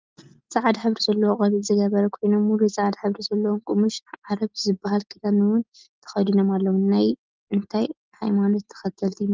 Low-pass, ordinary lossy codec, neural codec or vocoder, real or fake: 7.2 kHz; Opus, 32 kbps; none; real